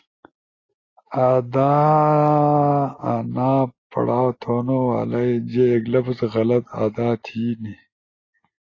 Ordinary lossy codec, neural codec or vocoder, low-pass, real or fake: AAC, 32 kbps; none; 7.2 kHz; real